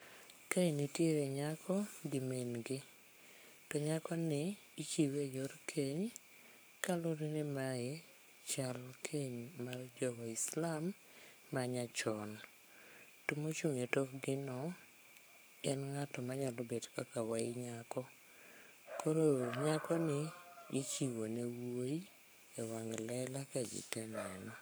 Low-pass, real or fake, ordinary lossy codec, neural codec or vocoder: none; fake; none; codec, 44.1 kHz, 7.8 kbps, Pupu-Codec